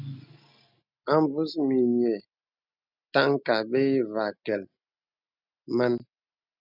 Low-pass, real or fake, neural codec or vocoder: 5.4 kHz; real; none